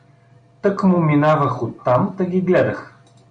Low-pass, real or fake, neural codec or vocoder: 9.9 kHz; real; none